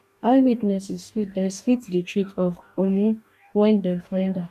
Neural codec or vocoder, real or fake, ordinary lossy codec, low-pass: codec, 44.1 kHz, 2.6 kbps, DAC; fake; none; 14.4 kHz